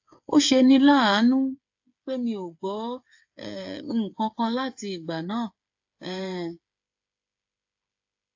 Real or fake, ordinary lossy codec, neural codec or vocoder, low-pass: fake; AAC, 48 kbps; codec, 16 kHz, 8 kbps, FreqCodec, smaller model; 7.2 kHz